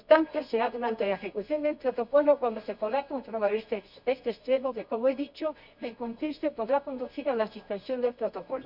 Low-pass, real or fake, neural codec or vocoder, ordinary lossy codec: 5.4 kHz; fake; codec, 24 kHz, 0.9 kbps, WavTokenizer, medium music audio release; none